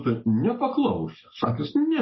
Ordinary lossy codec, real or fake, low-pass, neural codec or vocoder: MP3, 24 kbps; real; 7.2 kHz; none